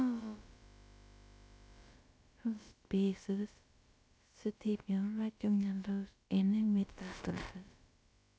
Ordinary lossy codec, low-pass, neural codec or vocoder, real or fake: none; none; codec, 16 kHz, about 1 kbps, DyCAST, with the encoder's durations; fake